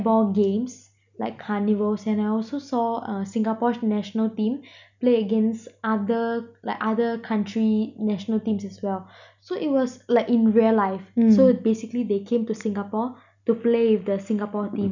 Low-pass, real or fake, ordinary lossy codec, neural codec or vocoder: 7.2 kHz; real; none; none